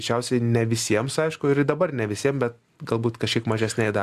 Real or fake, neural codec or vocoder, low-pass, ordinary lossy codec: real; none; 14.4 kHz; Opus, 64 kbps